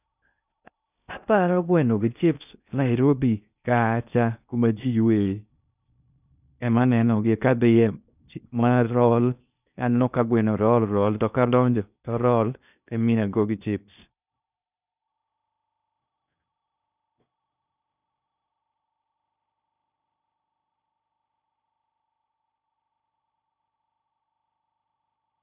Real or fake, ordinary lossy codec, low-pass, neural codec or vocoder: fake; none; 3.6 kHz; codec, 16 kHz in and 24 kHz out, 0.6 kbps, FocalCodec, streaming, 2048 codes